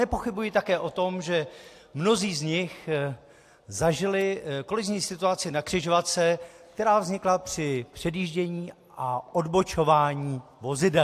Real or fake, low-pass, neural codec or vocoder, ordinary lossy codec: real; 14.4 kHz; none; AAC, 64 kbps